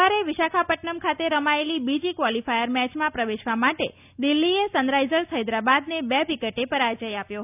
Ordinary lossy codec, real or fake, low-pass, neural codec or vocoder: none; real; 3.6 kHz; none